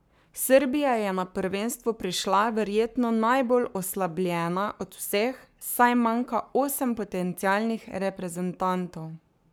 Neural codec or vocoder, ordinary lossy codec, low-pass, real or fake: codec, 44.1 kHz, 7.8 kbps, Pupu-Codec; none; none; fake